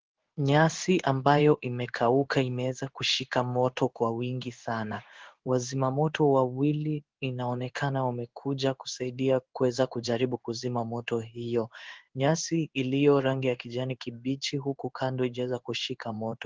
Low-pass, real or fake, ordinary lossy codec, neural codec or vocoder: 7.2 kHz; fake; Opus, 16 kbps; codec, 16 kHz in and 24 kHz out, 1 kbps, XY-Tokenizer